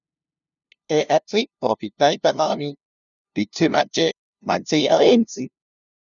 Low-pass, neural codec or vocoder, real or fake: 7.2 kHz; codec, 16 kHz, 0.5 kbps, FunCodec, trained on LibriTTS, 25 frames a second; fake